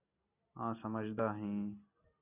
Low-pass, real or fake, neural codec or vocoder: 3.6 kHz; real; none